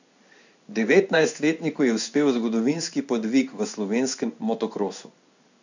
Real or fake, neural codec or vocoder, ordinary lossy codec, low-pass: fake; codec, 16 kHz in and 24 kHz out, 1 kbps, XY-Tokenizer; none; 7.2 kHz